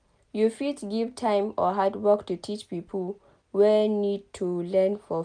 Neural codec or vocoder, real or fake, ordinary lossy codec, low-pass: none; real; none; 9.9 kHz